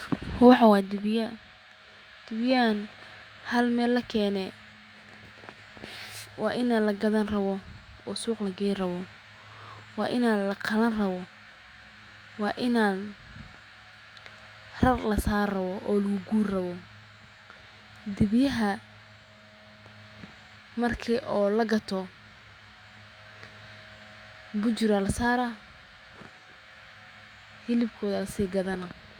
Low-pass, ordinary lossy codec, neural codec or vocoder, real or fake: 19.8 kHz; Opus, 64 kbps; autoencoder, 48 kHz, 128 numbers a frame, DAC-VAE, trained on Japanese speech; fake